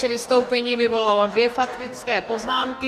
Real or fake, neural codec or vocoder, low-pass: fake; codec, 44.1 kHz, 2.6 kbps, DAC; 14.4 kHz